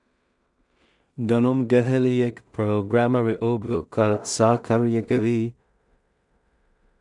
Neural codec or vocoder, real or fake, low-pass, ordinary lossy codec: codec, 16 kHz in and 24 kHz out, 0.4 kbps, LongCat-Audio-Codec, two codebook decoder; fake; 10.8 kHz; MP3, 96 kbps